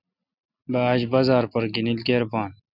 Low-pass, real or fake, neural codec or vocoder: 5.4 kHz; real; none